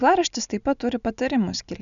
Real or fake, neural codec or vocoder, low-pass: real; none; 7.2 kHz